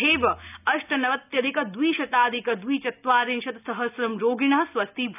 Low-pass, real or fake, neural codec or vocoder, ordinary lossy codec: 3.6 kHz; real; none; none